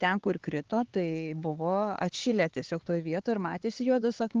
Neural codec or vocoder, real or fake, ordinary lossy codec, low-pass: codec, 16 kHz, 4 kbps, X-Codec, HuBERT features, trained on LibriSpeech; fake; Opus, 16 kbps; 7.2 kHz